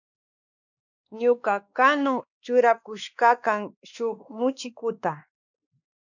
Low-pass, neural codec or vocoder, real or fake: 7.2 kHz; codec, 16 kHz, 2 kbps, X-Codec, WavLM features, trained on Multilingual LibriSpeech; fake